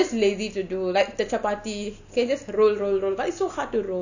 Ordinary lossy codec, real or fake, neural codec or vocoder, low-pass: none; real; none; 7.2 kHz